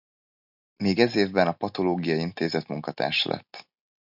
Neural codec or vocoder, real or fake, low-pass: none; real; 5.4 kHz